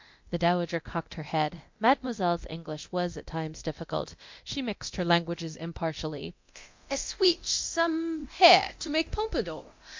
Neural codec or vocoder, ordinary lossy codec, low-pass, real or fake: codec, 24 kHz, 0.9 kbps, DualCodec; MP3, 48 kbps; 7.2 kHz; fake